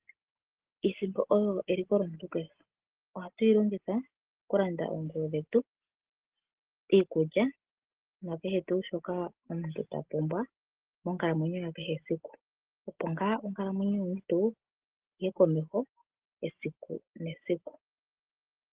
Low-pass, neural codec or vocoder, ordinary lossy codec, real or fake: 3.6 kHz; none; Opus, 16 kbps; real